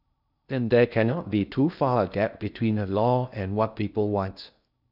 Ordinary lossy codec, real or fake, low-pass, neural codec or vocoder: none; fake; 5.4 kHz; codec, 16 kHz in and 24 kHz out, 0.6 kbps, FocalCodec, streaming, 2048 codes